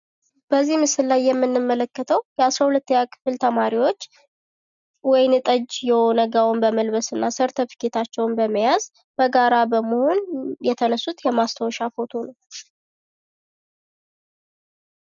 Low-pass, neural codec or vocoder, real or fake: 7.2 kHz; none; real